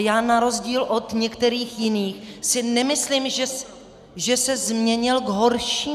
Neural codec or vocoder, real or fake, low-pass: none; real; 14.4 kHz